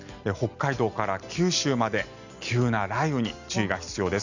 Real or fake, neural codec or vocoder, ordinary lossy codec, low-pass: real; none; none; 7.2 kHz